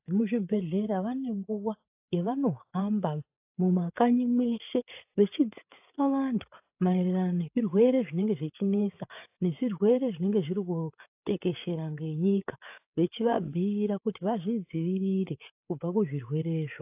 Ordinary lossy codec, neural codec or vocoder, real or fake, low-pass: AAC, 32 kbps; codec, 16 kHz, 8 kbps, FreqCodec, larger model; fake; 3.6 kHz